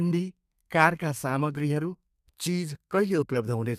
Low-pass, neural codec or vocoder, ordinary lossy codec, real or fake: 14.4 kHz; codec, 32 kHz, 1.9 kbps, SNAC; none; fake